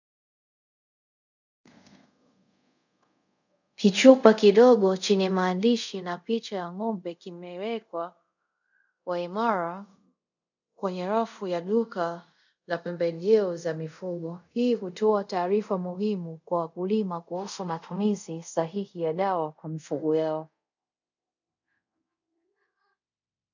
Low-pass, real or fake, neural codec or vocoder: 7.2 kHz; fake; codec, 24 kHz, 0.5 kbps, DualCodec